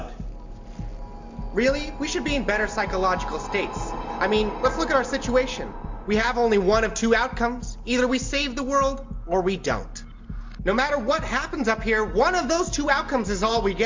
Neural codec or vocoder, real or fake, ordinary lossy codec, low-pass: none; real; MP3, 48 kbps; 7.2 kHz